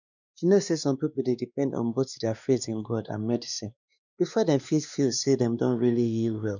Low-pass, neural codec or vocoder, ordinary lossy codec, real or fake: 7.2 kHz; codec, 16 kHz, 2 kbps, X-Codec, WavLM features, trained on Multilingual LibriSpeech; none; fake